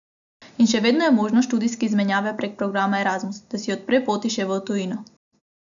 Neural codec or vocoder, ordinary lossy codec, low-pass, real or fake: none; none; 7.2 kHz; real